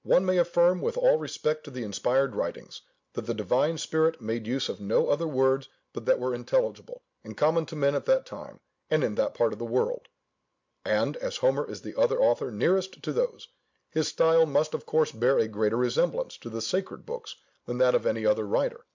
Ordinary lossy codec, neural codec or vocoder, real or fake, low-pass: MP3, 64 kbps; none; real; 7.2 kHz